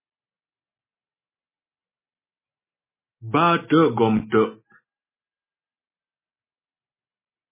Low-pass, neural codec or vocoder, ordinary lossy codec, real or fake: 3.6 kHz; none; MP3, 16 kbps; real